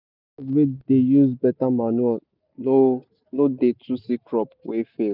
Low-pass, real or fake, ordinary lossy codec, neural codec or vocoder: 5.4 kHz; real; none; none